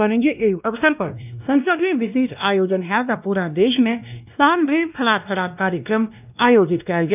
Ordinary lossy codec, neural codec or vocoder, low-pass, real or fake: none; codec, 16 kHz, 1 kbps, X-Codec, WavLM features, trained on Multilingual LibriSpeech; 3.6 kHz; fake